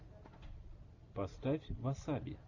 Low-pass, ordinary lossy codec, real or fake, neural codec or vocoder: 7.2 kHz; AAC, 48 kbps; real; none